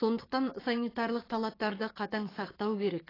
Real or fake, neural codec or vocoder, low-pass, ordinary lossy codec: fake; codec, 16 kHz, 6 kbps, DAC; 5.4 kHz; AAC, 24 kbps